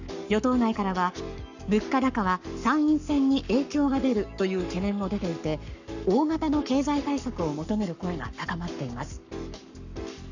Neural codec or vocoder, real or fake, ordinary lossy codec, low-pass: codec, 44.1 kHz, 7.8 kbps, Pupu-Codec; fake; none; 7.2 kHz